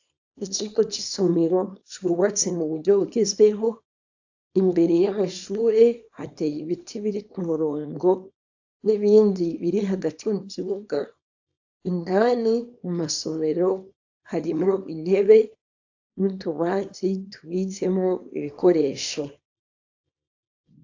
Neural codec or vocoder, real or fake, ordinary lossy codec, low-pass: codec, 24 kHz, 0.9 kbps, WavTokenizer, small release; fake; AAC, 48 kbps; 7.2 kHz